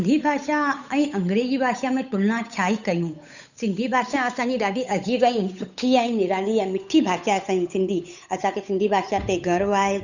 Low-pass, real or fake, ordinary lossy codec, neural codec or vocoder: 7.2 kHz; fake; none; codec, 16 kHz, 8 kbps, FunCodec, trained on Chinese and English, 25 frames a second